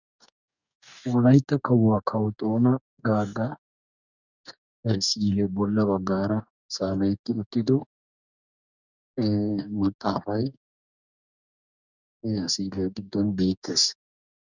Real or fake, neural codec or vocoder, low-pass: fake; codec, 44.1 kHz, 2.6 kbps, DAC; 7.2 kHz